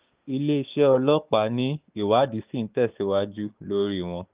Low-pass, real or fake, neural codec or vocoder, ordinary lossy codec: 3.6 kHz; fake; codec, 16 kHz, 6 kbps, DAC; Opus, 32 kbps